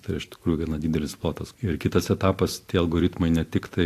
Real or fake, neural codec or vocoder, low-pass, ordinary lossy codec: real; none; 14.4 kHz; AAC, 64 kbps